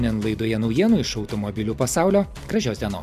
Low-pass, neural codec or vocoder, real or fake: 14.4 kHz; none; real